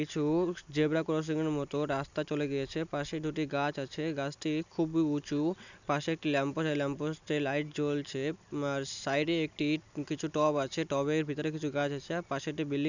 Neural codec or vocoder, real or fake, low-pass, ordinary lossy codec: none; real; 7.2 kHz; none